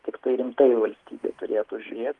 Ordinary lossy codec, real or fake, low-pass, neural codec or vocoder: Opus, 24 kbps; real; 10.8 kHz; none